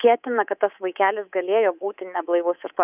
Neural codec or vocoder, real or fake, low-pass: codec, 24 kHz, 3.1 kbps, DualCodec; fake; 3.6 kHz